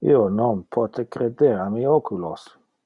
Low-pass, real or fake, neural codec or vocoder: 10.8 kHz; real; none